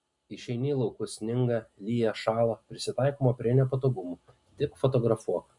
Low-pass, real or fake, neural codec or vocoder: 10.8 kHz; real; none